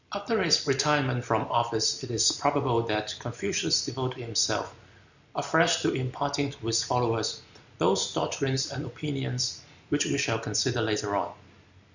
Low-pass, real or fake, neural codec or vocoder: 7.2 kHz; real; none